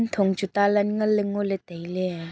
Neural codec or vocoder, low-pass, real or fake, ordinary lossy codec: none; none; real; none